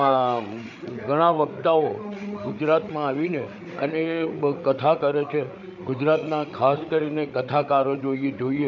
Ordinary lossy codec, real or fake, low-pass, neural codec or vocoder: none; fake; 7.2 kHz; codec, 16 kHz, 8 kbps, FreqCodec, larger model